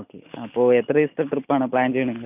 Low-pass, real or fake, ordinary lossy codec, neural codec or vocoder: 3.6 kHz; real; none; none